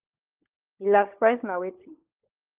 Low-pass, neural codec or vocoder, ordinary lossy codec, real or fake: 3.6 kHz; codec, 16 kHz, 16 kbps, FunCodec, trained on LibriTTS, 50 frames a second; Opus, 32 kbps; fake